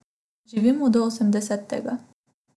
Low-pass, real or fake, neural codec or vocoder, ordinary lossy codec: none; real; none; none